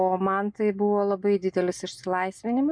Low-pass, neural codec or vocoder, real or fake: 9.9 kHz; none; real